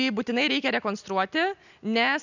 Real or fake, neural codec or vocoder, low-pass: real; none; 7.2 kHz